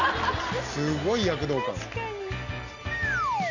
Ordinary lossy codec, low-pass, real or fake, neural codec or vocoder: none; 7.2 kHz; real; none